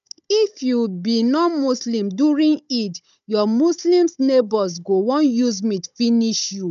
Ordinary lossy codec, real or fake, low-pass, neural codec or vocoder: none; fake; 7.2 kHz; codec, 16 kHz, 16 kbps, FunCodec, trained on Chinese and English, 50 frames a second